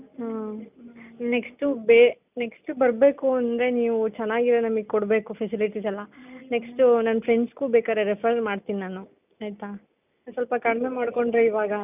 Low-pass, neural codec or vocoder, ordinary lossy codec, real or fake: 3.6 kHz; none; none; real